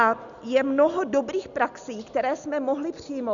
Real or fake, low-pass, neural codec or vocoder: real; 7.2 kHz; none